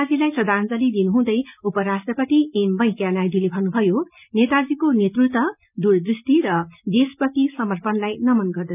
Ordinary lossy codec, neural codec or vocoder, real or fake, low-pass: none; none; real; 3.6 kHz